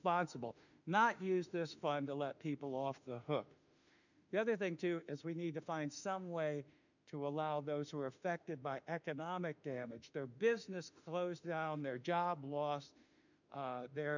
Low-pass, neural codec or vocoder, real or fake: 7.2 kHz; autoencoder, 48 kHz, 32 numbers a frame, DAC-VAE, trained on Japanese speech; fake